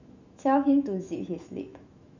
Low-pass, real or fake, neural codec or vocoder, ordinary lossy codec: 7.2 kHz; fake; autoencoder, 48 kHz, 128 numbers a frame, DAC-VAE, trained on Japanese speech; none